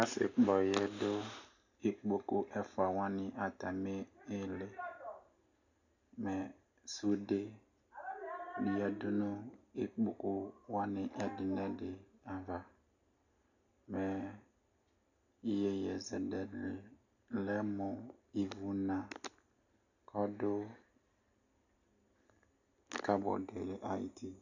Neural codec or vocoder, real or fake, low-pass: none; real; 7.2 kHz